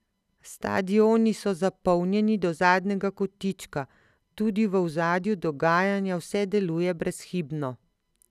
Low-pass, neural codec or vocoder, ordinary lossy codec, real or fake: 14.4 kHz; none; none; real